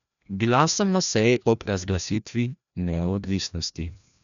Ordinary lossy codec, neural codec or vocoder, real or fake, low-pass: none; codec, 16 kHz, 1 kbps, FreqCodec, larger model; fake; 7.2 kHz